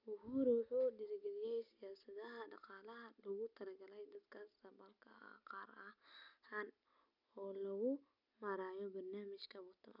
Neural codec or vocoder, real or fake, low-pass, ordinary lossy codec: none; real; 5.4 kHz; none